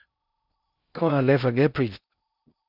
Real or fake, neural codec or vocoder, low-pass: fake; codec, 16 kHz in and 24 kHz out, 0.6 kbps, FocalCodec, streaming, 2048 codes; 5.4 kHz